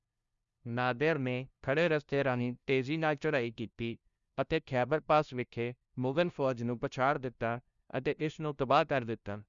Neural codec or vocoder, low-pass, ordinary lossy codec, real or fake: codec, 16 kHz, 0.5 kbps, FunCodec, trained on LibriTTS, 25 frames a second; 7.2 kHz; Opus, 64 kbps; fake